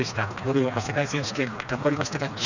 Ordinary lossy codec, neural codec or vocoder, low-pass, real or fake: none; codec, 16 kHz, 2 kbps, FreqCodec, smaller model; 7.2 kHz; fake